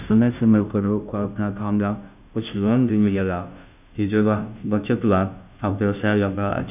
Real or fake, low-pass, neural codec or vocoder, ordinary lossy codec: fake; 3.6 kHz; codec, 16 kHz, 0.5 kbps, FunCodec, trained on Chinese and English, 25 frames a second; none